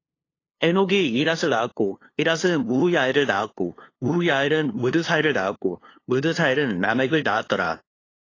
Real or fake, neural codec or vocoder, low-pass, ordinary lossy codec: fake; codec, 16 kHz, 2 kbps, FunCodec, trained on LibriTTS, 25 frames a second; 7.2 kHz; AAC, 32 kbps